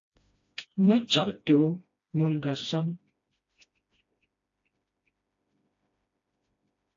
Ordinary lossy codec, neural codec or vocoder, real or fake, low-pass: AAC, 48 kbps; codec, 16 kHz, 1 kbps, FreqCodec, smaller model; fake; 7.2 kHz